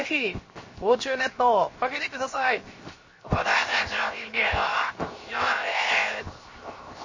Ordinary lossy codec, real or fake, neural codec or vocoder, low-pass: MP3, 32 kbps; fake; codec, 16 kHz, 0.7 kbps, FocalCodec; 7.2 kHz